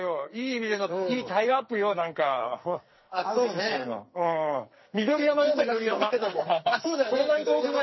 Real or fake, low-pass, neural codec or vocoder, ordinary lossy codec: fake; 7.2 kHz; codec, 44.1 kHz, 2.6 kbps, SNAC; MP3, 24 kbps